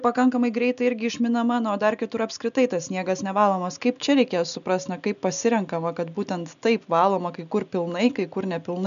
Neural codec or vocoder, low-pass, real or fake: none; 7.2 kHz; real